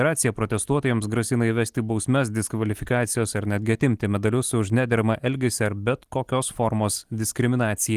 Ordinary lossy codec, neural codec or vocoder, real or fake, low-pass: Opus, 24 kbps; none; real; 14.4 kHz